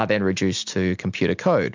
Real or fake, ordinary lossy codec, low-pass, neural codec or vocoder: real; MP3, 64 kbps; 7.2 kHz; none